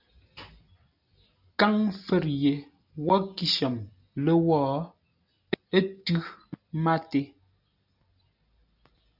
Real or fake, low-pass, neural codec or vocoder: real; 5.4 kHz; none